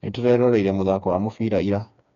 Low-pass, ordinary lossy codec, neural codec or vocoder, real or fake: 7.2 kHz; none; codec, 16 kHz, 4 kbps, FreqCodec, smaller model; fake